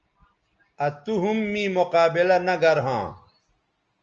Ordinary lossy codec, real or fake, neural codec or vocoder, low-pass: Opus, 24 kbps; real; none; 7.2 kHz